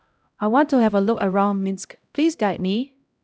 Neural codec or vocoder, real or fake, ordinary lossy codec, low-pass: codec, 16 kHz, 0.5 kbps, X-Codec, HuBERT features, trained on LibriSpeech; fake; none; none